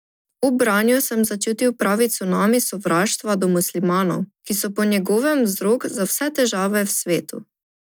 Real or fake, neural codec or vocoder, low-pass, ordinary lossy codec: real; none; none; none